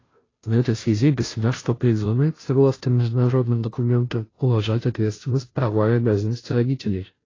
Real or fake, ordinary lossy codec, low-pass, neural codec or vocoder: fake; AAC, 32 kbps; 7.2 kHz; codec, 16 kHz, 0.5 kbps, FunCodec, trained on Chinese and English, 25 frames a second